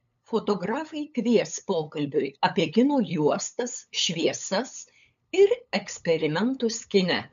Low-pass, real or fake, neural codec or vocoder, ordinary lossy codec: 7.2 kHz; fake; codec, 16 kHz, 8 kbps, FunCodec, trained on LibriTTS, 25 frames a second; MP3, 64 kbps